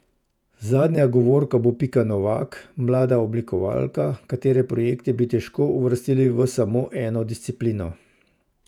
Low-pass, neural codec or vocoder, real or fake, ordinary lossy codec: 19.8 kHz; vocoder, 48 kHz, 128 mel bands, Vocos; fake; none